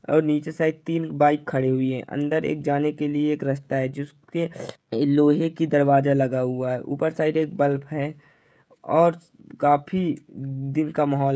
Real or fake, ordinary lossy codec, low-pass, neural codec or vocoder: fake; none; none; codec, 16 kHz, 16 kbps, FreqCodec, smaller model